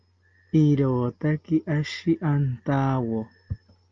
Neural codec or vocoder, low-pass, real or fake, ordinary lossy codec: none; 7.2 kHz; real; Opus, 24 kbps